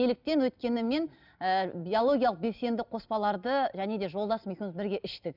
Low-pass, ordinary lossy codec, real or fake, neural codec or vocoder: 5.4 kHz; none; real; none